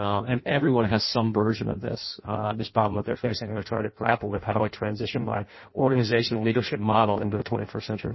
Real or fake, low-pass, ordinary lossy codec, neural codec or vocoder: fake; 7.2 kHz; MP3, 24 kbps; codec, 16 kHz in and 24 kHz out, 0.6 kbps, FireRedTTS-2 codec